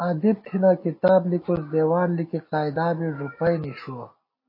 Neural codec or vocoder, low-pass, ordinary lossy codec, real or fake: none; 5.4 kHz; MP3, 24 kbps; real